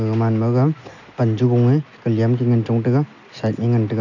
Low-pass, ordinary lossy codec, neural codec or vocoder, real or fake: 7.2 kHz; none; none; real